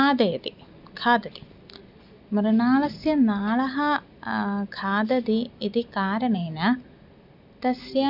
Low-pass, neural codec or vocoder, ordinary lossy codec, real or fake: 5.4 kHz; none; none; real